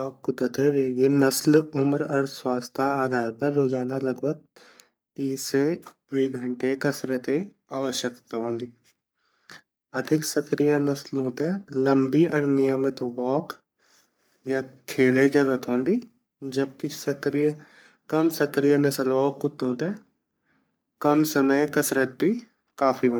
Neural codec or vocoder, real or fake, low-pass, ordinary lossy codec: codec, 44.1 kHz, 3.4 kbps, Pupu-Codec; fake; none; none